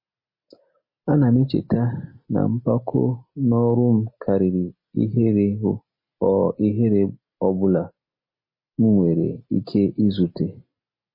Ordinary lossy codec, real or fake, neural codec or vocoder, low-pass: MP3, 24 kbps; real; none; 5.4 kHz